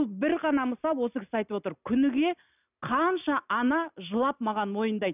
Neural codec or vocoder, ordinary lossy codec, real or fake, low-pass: none; none; real; 3.6 kHz